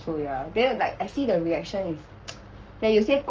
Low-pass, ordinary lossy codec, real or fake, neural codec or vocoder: 7.2 kHz; Opus, 24 kbps; fake; codec, 44.1 kHz, 7.8 kbps, Pupu-Codec